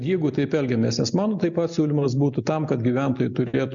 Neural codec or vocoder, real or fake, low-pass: none; real; 7.2 kHz